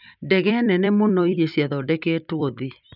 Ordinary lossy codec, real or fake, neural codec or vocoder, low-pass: none; fake; vocoder, 44.1 kHz, 128 mel bands every 256 samples, BigVGAN v2; 5.4 kHz